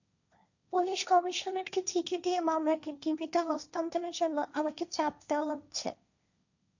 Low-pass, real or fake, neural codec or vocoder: 7.2 kHz; fake; codec, 16 kHz, 1.1 kbps, Voila-Tokenizer